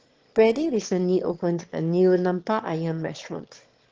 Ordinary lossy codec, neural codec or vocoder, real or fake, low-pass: Opus, 16 kbps; autoencoder, 22.05 kHz, a latent of 192 numbers a frame, VITS, trained on one speaker; fake; 7.2 kHz